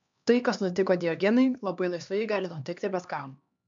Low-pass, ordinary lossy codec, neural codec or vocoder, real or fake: 7.2 kHz; MP3, 64 kbps; codec, 16 kHz, 2 kbps, X-Codec, HuBERT features, trained on LibriSpeech; fake